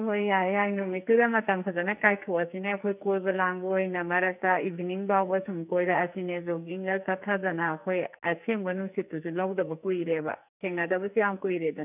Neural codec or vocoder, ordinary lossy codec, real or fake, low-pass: codec, 44.1 kHz, 2.6 kbps, SNAC; none; fake; 3.6 kHz